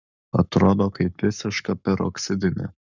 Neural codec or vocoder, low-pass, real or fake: codec, 44.1 kHz, 7.8 kbps, Pupu-Codec; 7.2 kHz; fake